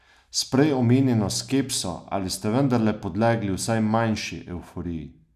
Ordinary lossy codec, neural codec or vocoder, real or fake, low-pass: none; none; real; 14.4 kHz